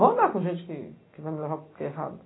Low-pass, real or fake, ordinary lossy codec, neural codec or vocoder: 7.2 kHz; real; AAC, 16 kbps; none